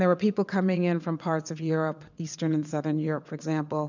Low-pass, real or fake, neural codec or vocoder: 7.2 kHz; fake; vocoder, 22.05 kHz, 80 mel bands, WaveNeXt